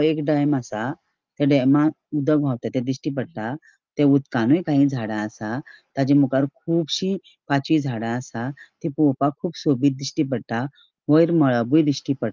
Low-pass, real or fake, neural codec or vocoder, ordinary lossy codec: 7.2 kHz; real; none; Opus, 24 kbps